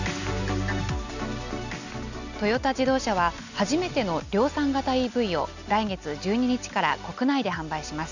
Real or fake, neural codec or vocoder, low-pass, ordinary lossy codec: real; none; 7.2 kHz; none